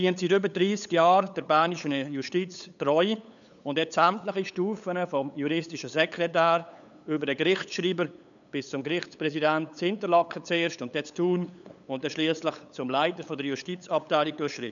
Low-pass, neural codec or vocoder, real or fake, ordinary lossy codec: 7.2 kHz; codec, 16 kHz, 8 kbps, FunCodec, trained on LibriTTS, 25 frames a second; fake; none